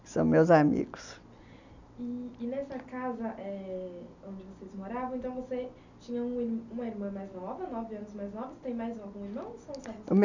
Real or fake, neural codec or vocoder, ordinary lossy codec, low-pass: real; none; none; 7.2 kHz